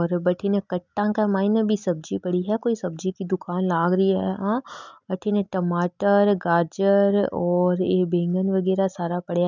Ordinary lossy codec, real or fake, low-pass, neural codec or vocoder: none; real; 7.2 kHz; none